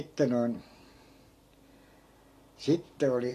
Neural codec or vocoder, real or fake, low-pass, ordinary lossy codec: none; real; 14.4 kHz; AAC, 48 kbps